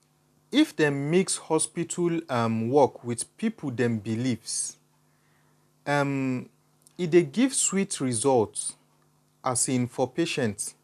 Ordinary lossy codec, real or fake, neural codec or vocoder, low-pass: none; real; none; 14.4 kHz